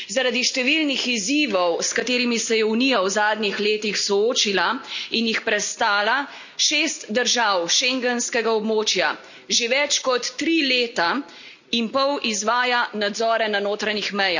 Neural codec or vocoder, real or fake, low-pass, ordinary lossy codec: none; real; 7.2 kHz; none